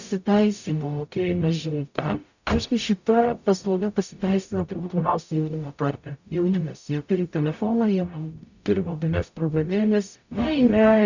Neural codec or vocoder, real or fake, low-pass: codec, 44.1 kHz, 0.9 kbps, DAC; fake; 7.2 kHz